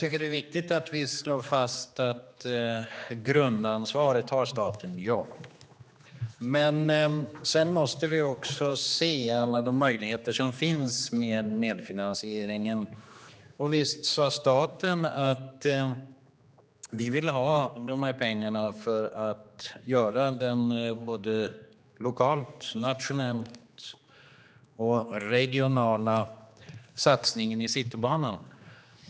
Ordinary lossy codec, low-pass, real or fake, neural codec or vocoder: none; none; fake; codec, 16 kHz, 2 kbps, X-Codec, HuBERT features, trained on general audio